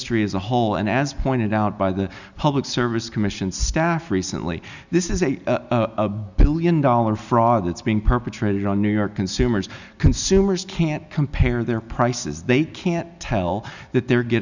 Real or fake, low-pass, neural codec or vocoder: real; 7.2 kHz; none